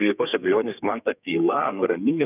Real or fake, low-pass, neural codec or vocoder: fake; 3.6 kHz; codec, 32 kHz, 1.9 kbps, SNAC